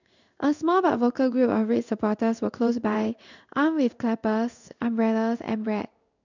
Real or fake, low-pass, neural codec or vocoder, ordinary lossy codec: fake; 7.2 kHz; codec, 16 kHz in and 24 kHz out, 1 kbps, XY-Tokenizer; none